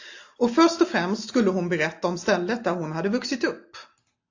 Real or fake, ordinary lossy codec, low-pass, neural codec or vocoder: real; AAC, 48 kbps; 7.2 kHz; none